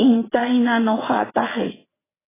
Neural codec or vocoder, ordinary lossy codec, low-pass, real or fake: vocoder, 22.05 kHz, 80 mel bands, WaveNeXt; AAC, 16 kbps; 3.6 kHz; fake